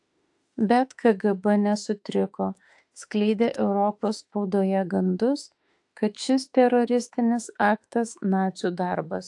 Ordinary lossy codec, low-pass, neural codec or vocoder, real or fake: AAC, 64 kbps; 10.8 kHz; autoencoder, 48 kHz, 32 numbers a frame, DAC-VAE, trained on Japanese speech; fake